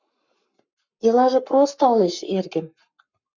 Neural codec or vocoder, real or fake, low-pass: codec, 44.1 kHz, 7.8 kbps, Pupu-Codec; fake; 7.2 kHz